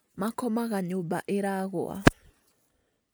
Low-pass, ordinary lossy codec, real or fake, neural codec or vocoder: none; none; real; none